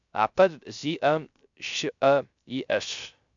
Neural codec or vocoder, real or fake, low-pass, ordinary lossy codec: codec, 16 kHz, 0.3 kbps, FocalCodec; fake; 7.2 kHz; AAC, 64 kbps